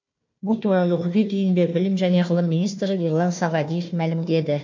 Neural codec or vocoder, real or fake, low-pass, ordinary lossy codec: codec, 16 kHz, 1 kbps, FunCodec, trained on Chinese and English, 50 frames a second; fake; 7.2 kHz; MP3, 48 kbps